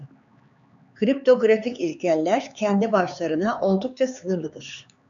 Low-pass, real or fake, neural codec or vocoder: 7.2 kHz; fake; codec, 16 kHz, 4 kbps, X-Codec, HuBERT features, trained on LibriSpeech